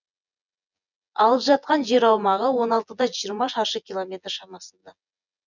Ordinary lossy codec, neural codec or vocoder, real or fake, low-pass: none; vocoder, 24 kHz, 100 mel bands, Vocos; fake; 7.2 kHz